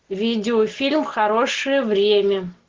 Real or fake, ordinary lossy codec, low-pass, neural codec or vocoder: real; Opus, 16 kbps; 7.2 kHz; none